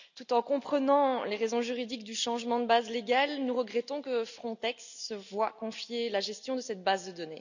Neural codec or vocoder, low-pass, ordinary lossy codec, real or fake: none; 7.2 kHz; none; real